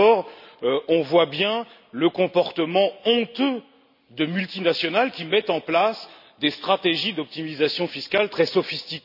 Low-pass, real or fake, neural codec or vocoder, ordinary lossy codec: 5.4 kHz; real; none; none